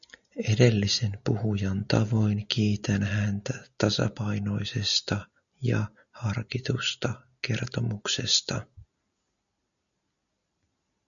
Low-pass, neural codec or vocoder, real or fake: 7.2 kHz; none; real